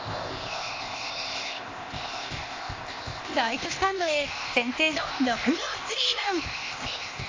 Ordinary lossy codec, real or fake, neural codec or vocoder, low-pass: AAC, 32 kbps; fake; codec, 16 kHz, 0.8 kbps, ZipCodec; 7.2 kHz